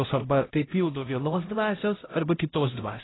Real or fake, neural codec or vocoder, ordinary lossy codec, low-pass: fake; codec, 16 kHz, 0.5 kbps, X-Codec, HuBERT features, trained on LibriSpeech; AAC, 16 kbps; 7.2 kHz